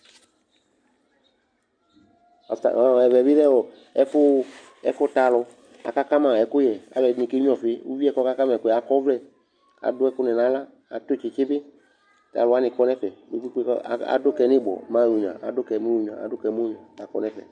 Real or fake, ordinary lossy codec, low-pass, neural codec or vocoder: real; MP3, 64 kbps; 9.9 kHz; none